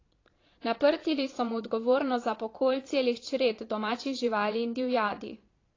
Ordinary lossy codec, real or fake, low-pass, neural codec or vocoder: AAC, 32 kbps; fake; 7.2 kHz; vocoder, 22.05 kHz, 80 mel bands, Vocos